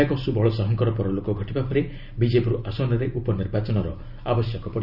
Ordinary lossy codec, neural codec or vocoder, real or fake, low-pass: none; none; real; 5.4 kHz